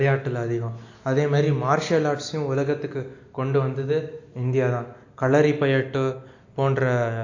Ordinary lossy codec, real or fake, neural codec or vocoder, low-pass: AAC, 48 kbps; real; none; 7.2 kHz